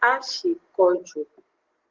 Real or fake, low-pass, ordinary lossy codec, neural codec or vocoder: real; 7.2 kHz; Opus, 16 kbps; none